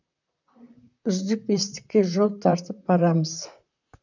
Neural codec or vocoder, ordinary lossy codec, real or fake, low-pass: vocoder, 44.1 kHz, 128 mel bands, Pupu-Vocoder; none; fake; 7.2 kHz